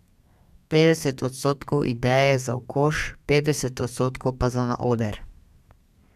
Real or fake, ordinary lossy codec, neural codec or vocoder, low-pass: fake; none; codec, 32 kHz, 1.9 kbps, SNAC; 14.4 kHz